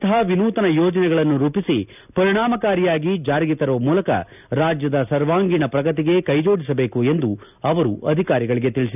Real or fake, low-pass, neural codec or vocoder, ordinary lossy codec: real; 3.6 kHz; none; none